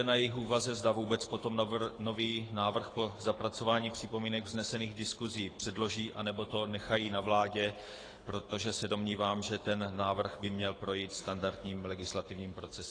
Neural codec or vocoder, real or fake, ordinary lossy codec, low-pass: codec, 24 kHz, 6 kbps, HILCodec; fake; AAC, 32 kbps; 9.9 kHz